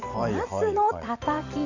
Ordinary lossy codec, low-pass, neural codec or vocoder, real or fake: none; 7.2 kHz; vocoder, 44.1 kHz, 128 mel bands every 512 samples, BigVGAN v2; fake